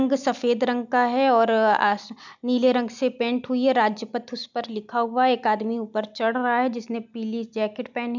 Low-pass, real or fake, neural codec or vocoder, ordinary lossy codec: 7.2 kHz; real; none; none